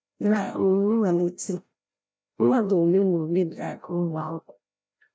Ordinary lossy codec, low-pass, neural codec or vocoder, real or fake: none; none; codec, 16 kHz, 0.5 kbps, FreqCodec, larger model; fake